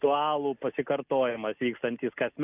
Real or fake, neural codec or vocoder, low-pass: real; none; 3.6 kHz